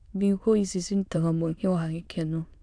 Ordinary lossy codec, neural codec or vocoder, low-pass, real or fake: AAC, 64 kbps; autoencoder, 22.05 kHz, a latent of 192 numbers a frame, VITS, trained on many speakers; 9.9 kHz; fake